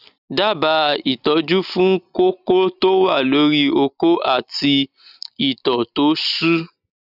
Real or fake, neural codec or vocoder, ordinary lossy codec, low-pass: real; none; none; 5.4 kHz